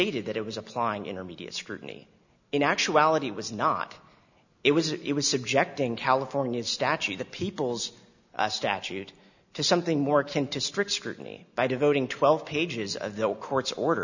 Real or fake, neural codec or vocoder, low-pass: real; none; 7.2 kHz